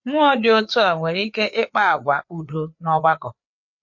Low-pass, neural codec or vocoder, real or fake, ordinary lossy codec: 7.2 kHz; codec, 16 kHz, 4 kbps, FreqCodec, larger model; fake; MP3, 48 kbps